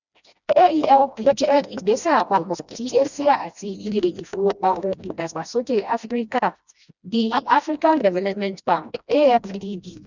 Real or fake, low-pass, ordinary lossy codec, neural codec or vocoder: fake; 7.2 kHz; none; codec, 16 kHz, 1 kbps, FreqCodec, smaller model